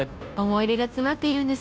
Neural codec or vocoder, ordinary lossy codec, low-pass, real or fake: codec, 16 kHz, 0.5 kbps, FunCodec, trained on Chinese and English, 25 frames a second; none; none; fake